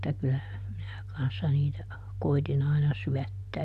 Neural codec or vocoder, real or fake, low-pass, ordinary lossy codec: none; real; 14.4 kHz; none